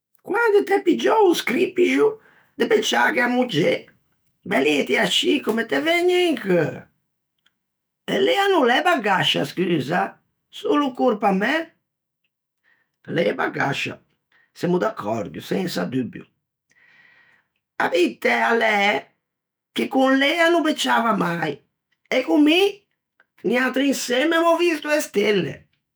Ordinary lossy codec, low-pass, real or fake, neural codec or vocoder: none; none; fake; autoencoder, 48 kHz, 128 numbers a frame, DAC-VAE, trained on Japanese speech